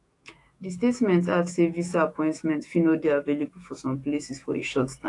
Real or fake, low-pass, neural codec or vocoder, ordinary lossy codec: fake; 10.8 kHz; autoencoder, 48 kHz, 128 numbers a frame, DAC-VAE, trained on Japanese speech; AAC, 48 kbps